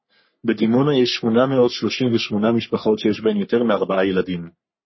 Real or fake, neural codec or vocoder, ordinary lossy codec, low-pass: fake; codec, 44.1 kHz, 3.4 kbps, Pupu-Codec; MP3, 24 kbps; 7.2 kHz